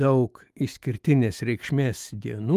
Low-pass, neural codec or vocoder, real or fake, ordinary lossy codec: 14.4 kHz; autoencoder, 48 kHz, 128 numbers a frame, DAC-VAE, trained on Japanese speech; fake; Opus, 32 kbps